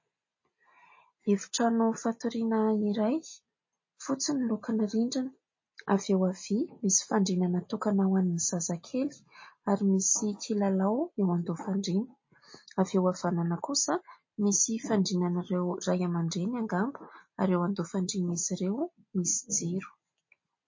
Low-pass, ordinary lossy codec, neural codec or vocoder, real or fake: 7.2 kHz; MP3, 32 kbps; none; real